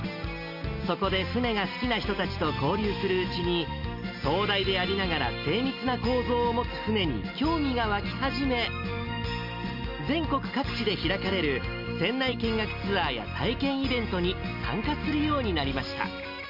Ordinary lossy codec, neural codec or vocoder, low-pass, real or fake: none; none; 5.4 kHz; real